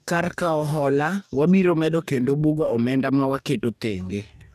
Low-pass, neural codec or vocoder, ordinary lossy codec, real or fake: 14.4 kHz; codec, 44.1 kHz, 2.6 kbps, DAC; none; fake